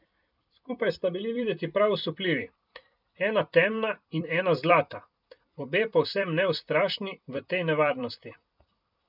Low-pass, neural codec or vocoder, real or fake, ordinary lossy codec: 5.4 kHz; vocoder, 44.1 kHz, 128 mel bands every 256 samples, BigVGAN v2; fake; none